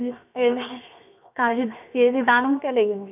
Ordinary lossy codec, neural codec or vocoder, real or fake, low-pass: none; codec, 16 kHz, 0.7 kbps, FocalCodec; fake; 3.6 kHz